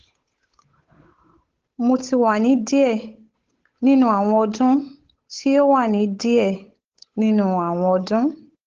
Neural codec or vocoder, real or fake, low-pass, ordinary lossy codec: codec, 16 kHz, 8 kbps, FunCodec, trained on Chinese and English, 25 frames a second; fake; 7.2 kHz; Opus, 16 kbps